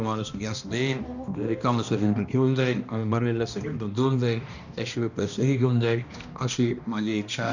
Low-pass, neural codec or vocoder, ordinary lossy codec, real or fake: 7.2 kHz; codec, 16 kHz, 1 kbps, X-Codec, HuBERT features, trained on balanced general audio; none; fake